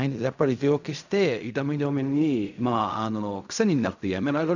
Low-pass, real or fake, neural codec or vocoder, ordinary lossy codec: 7.2 kHz; fake; codec, 16 kHz in and 24 kHz out, 0.4 kbps, LongCat-Audio-Codec, fine tuned four codebook decoder; none